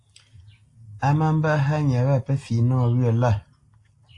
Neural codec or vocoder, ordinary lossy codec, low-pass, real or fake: none; AAC, 48 kbps; 10.8 kHz; real